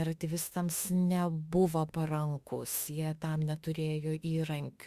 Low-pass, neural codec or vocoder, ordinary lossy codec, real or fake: 14.4 kHz; autoencoder, 48 kHz, 32 numbers a frame, DAC-VAE, trained on Japanese speech; Opus, 64 kbps; fake